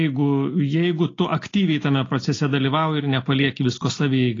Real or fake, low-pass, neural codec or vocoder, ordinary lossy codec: real; 7.2 kHz; none; AAC, 32 kbps